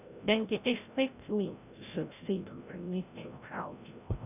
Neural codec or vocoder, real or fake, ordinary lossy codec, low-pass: codec, 16 kHz, 0.5 kbps, FreqCodec, larger model; fake; none; 3.6 kHz